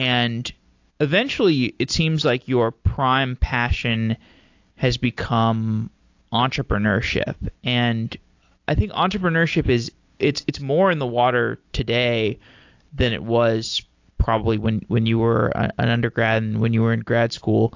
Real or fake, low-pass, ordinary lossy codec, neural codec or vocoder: real; 7.2 kHz; AAC, 48 kbps; none